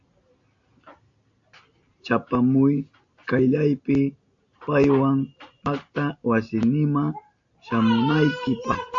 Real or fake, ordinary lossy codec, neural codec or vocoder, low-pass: real; AAC, 48 kbps; none; 7.2 kHz